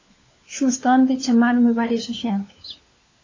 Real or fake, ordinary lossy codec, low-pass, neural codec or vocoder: fake; AAC, 32 kbps; 7.2 kHz; codec, 16 kHz, 4 kbps, FunCodec, trained on LibriTTS, 50 frames a second